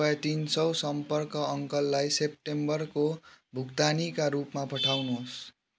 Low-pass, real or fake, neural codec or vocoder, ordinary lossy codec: none; real; none; none